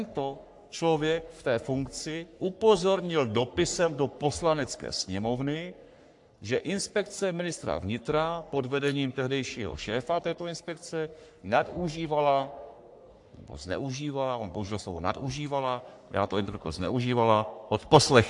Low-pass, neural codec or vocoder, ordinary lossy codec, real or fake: 10.8 kHz; codec, 44.1 kHz, 3.4 kbps, Pupu-Codec; AAC, 64 kbps; fake